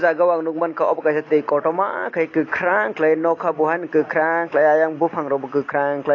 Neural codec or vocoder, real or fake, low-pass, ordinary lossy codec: none; real; 7.2 kHz; AAC, 32 kbps